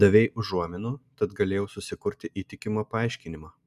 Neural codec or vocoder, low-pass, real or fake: none; 14.4 kHz; real